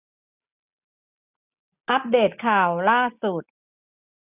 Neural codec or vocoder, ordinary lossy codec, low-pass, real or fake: none; none; 3.6 kHz; real